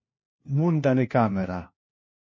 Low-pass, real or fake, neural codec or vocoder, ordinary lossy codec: 7.2 kHz; fake; codec, 16 kHz, 1 kbps, FunCodec, trained on LibriTTS, 50 frames a second; MP3, 32 kbps